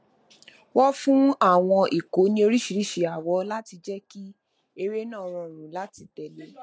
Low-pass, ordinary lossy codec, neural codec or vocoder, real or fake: none; none; none; real